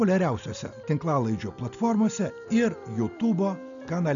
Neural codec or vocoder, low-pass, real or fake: none; 7.2 kHz; real